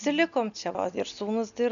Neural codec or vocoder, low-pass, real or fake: none; 7.2 kHz; real